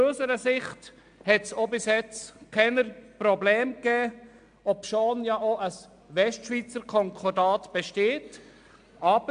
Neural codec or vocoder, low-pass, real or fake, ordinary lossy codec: none; 9.9 kHz; real; AAC, 64 kbps